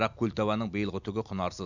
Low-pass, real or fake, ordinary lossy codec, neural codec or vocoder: 7.2 kHz; real; none; none